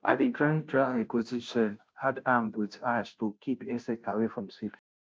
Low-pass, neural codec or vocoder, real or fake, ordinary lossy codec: none; codec, 16 kHz, 0.5 kbps, FunCodec, trained on Chinese and English, 25 frames a second; fake; none